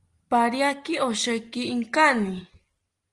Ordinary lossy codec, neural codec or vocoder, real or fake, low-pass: Opus, 24 kbps; none; real; 10.8 kHz